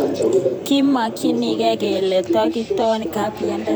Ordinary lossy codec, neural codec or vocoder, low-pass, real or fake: none; vocoder, 44.1 kHz, 128 mel bands, Pupu-Vocoder; none; fake